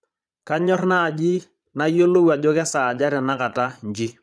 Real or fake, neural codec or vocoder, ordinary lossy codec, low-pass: fake; vocoder, 22.05 kHz, 80 mel bands, Vocos; none; none